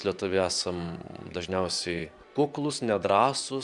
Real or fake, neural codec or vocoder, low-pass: fake; vocoder, 24 kHz, 100 mel bands, Vocos; 10.8 kHz